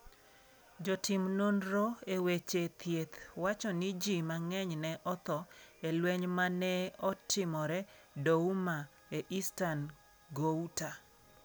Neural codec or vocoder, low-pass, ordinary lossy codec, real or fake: none; none; none; real